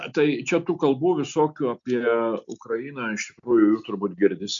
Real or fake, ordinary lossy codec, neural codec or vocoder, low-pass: real; MP3, 64 kbps; none; 7.2 kHz